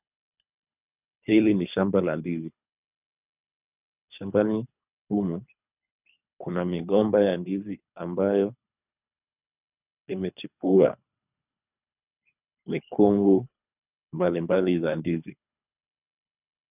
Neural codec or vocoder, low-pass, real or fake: codec, 24 kHz, 3 kbps, HILCodec; 3.6 kHz; fake